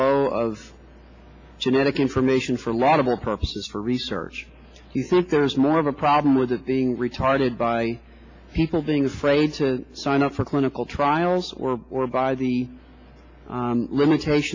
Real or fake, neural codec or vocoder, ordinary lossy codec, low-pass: real; none; AAC, 48 kbps; 7.2 kHz